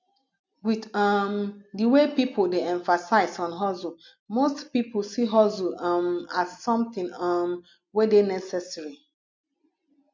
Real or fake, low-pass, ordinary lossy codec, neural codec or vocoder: real; 7.2 kHz; MP3, 48 kbps; none